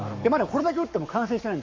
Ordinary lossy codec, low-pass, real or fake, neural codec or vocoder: MP3, 48 kbps; 7.2 kHz; fake; codec, 44.1 kHz, 7.8 kbps, Pupu-Codec